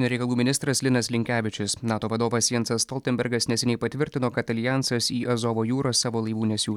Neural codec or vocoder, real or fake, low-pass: none; real; 19.8 kHz